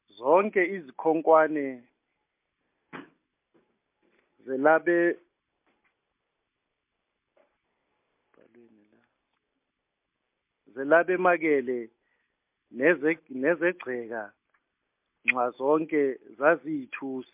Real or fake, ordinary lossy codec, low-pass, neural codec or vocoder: real; MP3, 32 kbps; 3.6 kHz; none